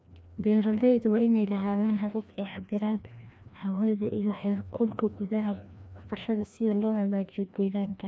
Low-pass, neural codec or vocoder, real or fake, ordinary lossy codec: none; codec, 16 kHz, 1 kbps, FreqCodec, larger model; fake; none